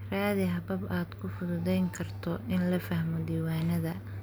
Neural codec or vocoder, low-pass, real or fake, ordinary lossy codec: none; none; real; none